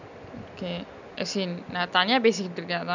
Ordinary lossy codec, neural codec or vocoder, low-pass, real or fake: none; none; 7.2 kHz; real